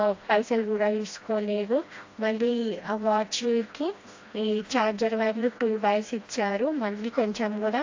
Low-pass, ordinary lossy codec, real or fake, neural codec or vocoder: 7.2 kHz; AAC, 48 kbps; fake; codec, 16 kHz, 1 kbps, FreqCodec, smaller model